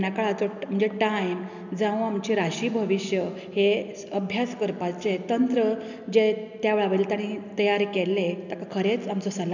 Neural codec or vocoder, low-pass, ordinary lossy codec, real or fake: none; 7.2 kHz; none; real